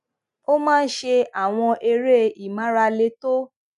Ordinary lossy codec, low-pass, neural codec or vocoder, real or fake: none; 10.8 kHz; none; real